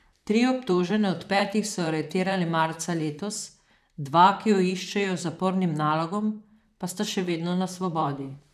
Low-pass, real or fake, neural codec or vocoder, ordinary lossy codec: 14.4 kHz; fake; vocoder, 44.1 kHz, 128 mel bands, Pupu-Vocoder; none